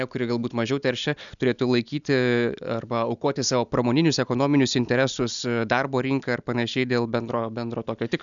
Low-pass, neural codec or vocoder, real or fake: 7.2 kHz; none; real